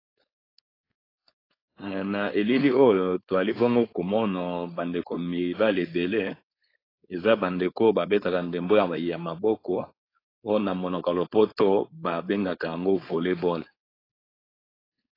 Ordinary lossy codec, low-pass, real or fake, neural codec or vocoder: AAC, 24 kbps; 5.4 kHz; fake; codec, 16 kHz, 4.8 kbps, FACodec